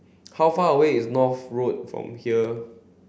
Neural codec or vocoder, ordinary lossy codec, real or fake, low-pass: none; none; real; none